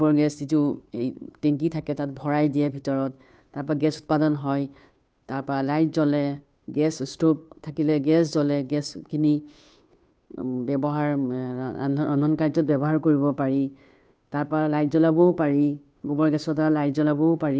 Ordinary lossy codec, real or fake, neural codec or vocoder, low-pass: none; fake; codec, 16 kHz, 2 kbps, FunCodec, trained on Chinese and English, 25 frames a second; none